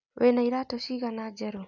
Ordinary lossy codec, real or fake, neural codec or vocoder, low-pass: none; real; none; 7.2 kHz